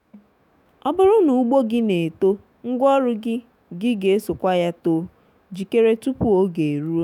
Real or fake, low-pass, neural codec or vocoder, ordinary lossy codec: fake; 19.8 kHz; autoencoder, 48 kHz, 128 numbers a frame, DAC-VAE, trained on Japanese speech; none